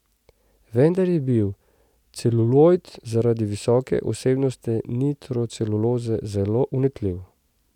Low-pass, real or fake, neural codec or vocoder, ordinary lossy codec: 19.8 kHz; real; none; none